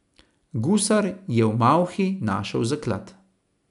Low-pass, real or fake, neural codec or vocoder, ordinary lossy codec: 10.8 kHz; real; none; none